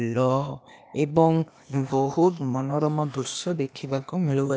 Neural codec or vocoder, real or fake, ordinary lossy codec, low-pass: codec, 16 kHz, 0.8 kbps, ZipCodec; fake; none; none